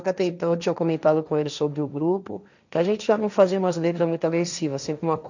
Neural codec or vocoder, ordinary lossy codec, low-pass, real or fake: codec, 16 kHz, 1.1 kbps, Voila-Tokenizer; none; 7.2 kHz; fake